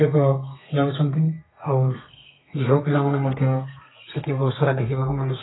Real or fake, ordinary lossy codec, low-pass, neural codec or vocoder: fake; AAC, 16 kbps; 7.2 kHz; codec, 32 kHz, 1.9 kbps, SNAC